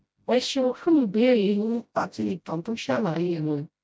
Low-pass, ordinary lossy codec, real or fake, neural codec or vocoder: none; none; fake; codec, 16 kHz, 0.5 kbps, FreqCodec, smaller model